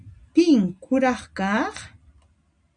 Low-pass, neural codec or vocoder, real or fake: 9.9 kHz; none; real